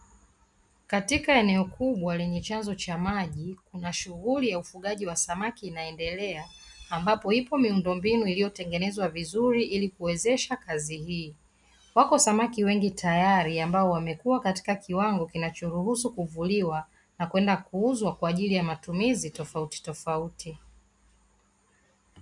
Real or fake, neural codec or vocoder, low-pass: real; none; 10.8 kHz